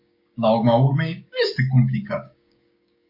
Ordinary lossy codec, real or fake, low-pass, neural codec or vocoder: MP3, 32 kbps; fake; 5.4 kHz; codec, 16 kHz, 6 kbps, DAC